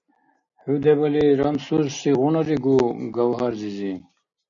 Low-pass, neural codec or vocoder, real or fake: 7.2 kHz; none; real